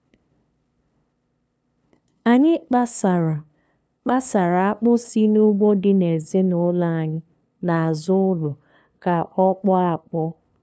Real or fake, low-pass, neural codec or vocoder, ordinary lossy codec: fake; none; codec, 16 kHz, 2 kbps, FunCodec, trained on LibriTTS, 25 frames a second; none